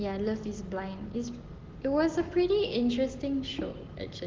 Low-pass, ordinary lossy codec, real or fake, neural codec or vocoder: 7.2 kHz; Opus, 16 kbps; real; none